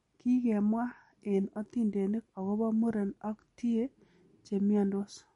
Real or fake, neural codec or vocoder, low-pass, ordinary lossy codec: real; none; 10.8 kHz; MP3, 48 kbps